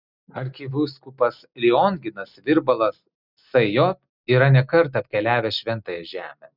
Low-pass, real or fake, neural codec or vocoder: 5.4 kHz; real; none